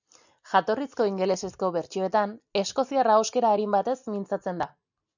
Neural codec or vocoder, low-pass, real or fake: none; 7.2 kHz; real